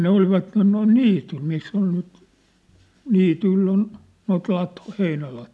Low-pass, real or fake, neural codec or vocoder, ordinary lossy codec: none; real; none; none